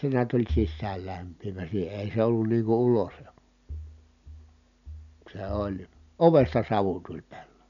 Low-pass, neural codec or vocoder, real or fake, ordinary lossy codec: 7.2 kHz; none; real; none